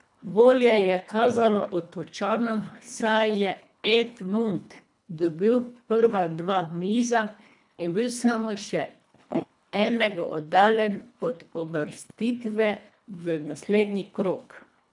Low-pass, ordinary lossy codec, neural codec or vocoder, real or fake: 10.8 kHz; none; codec, 24 kHz, 1.5 kbps, HILCodec; fake